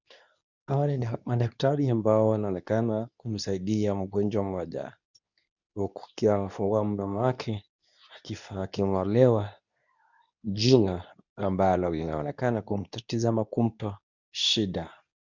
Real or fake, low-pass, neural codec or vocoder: fake; 7.2 kHz; codec, 24 kHz, 0.9 kbps, WavTokenizer, medium speech release version 2